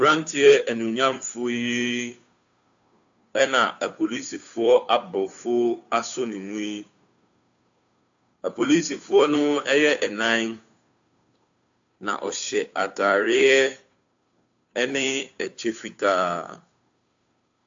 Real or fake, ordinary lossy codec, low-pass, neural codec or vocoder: fake; AAC, 64 kbps; 7.2 kHz; codec, 16 kHz, 2 kbps, FunCodec, trained on Chinese and English, 25 frames a second